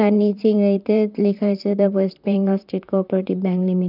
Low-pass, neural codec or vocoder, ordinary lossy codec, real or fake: 5.4 kHz; vocoder, 44.1 kHz, 128 mel bands, Pupu-Vocoder; none; fake